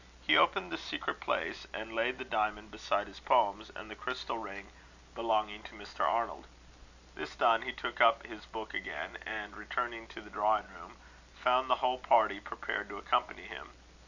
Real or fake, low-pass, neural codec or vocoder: real; 7.2 kHz; none